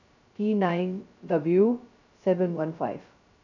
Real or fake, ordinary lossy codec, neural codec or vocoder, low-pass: fake; none; codec, 16 kHz, 0.2 kbps, FocalCodec; 7.2 kHz